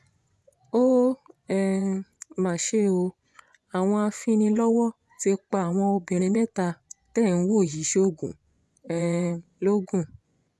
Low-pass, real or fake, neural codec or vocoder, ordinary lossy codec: none; fake; vocoder, 24 kHz, 100 mel bands, Vocos; none